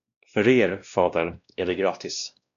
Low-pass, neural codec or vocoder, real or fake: 7.2 kHz; codec, 16 kHz, 1 kbps, X-Codec, WavLM features, trained on Multilingual LibriSpeech; fake